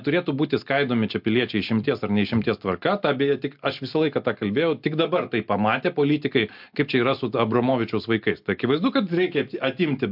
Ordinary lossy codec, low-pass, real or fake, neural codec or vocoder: MP3, 48 kbps; 5.4 kHz; real; none